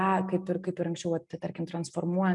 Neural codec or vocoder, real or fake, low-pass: none; real; 10.8 kHz